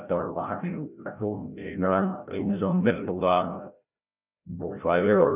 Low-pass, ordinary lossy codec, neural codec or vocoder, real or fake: 3.6 kHz; none; codec, 16 kHz, 0.5 kbps, FreqCodec, larger model; fake